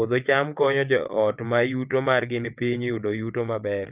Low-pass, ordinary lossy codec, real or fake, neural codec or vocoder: 3.6 kHz; Opus, 24 kbps; fake; vocoder, 22.05 kHz, 80 mel bands, WaveNeXt